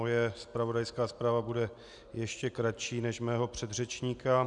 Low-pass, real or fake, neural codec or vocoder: 10.8 kHz; real; none